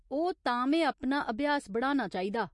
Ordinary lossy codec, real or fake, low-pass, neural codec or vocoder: MP3, 48 kbps; real; 10.8 kHz; none